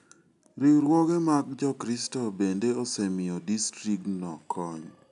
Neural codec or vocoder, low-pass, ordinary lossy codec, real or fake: none; 10.8 kHz; none; real